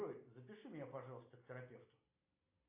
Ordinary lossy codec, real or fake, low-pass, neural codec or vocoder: AAC, 24 kbps; real; 3.6 kHz; none